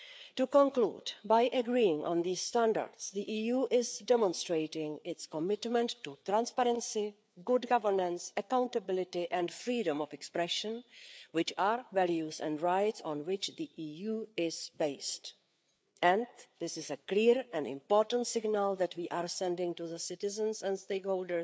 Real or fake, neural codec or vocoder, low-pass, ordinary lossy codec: fake; codec, 16 kHz, 4 kbps, FreqCodec, larger model; none; none